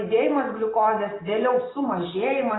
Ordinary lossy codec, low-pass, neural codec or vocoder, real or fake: AAC, 16 kbps; 7.2 kHz; none; real